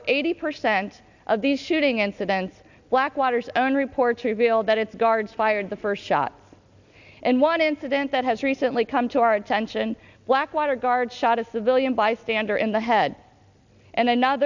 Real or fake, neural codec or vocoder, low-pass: real; none; 7.2 kHz